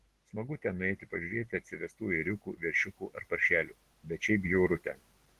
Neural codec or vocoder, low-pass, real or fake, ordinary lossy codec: none; 14.4 kHz; real; Opus, 16 kbps